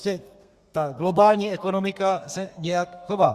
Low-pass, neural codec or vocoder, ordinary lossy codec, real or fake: 14.4 kHz; codec, 32 kHz, 1.9 kbps, SNAC; Opus, 64 kbps; fake